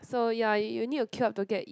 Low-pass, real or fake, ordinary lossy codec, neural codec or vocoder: none; real; none; none